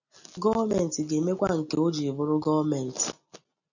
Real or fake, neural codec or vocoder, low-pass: real; none; 7.2 kHz